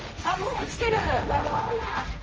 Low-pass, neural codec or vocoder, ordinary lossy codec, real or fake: 7.2 kHz; codec, 16 kHz, 1.1 kbps, Voila-Tokenizer; Opus, 24 kbps; fake